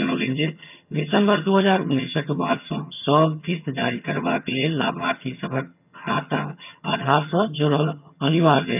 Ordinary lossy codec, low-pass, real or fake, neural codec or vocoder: none; 3.6 kHz; fake; vocoder, 22.05 kHz, 80 mel bands, HiFi-GAN